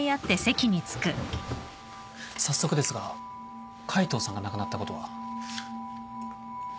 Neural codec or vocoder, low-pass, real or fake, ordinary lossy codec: none; none; real; none